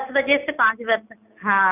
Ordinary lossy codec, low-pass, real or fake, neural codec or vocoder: none; 3.6 kHz; real; none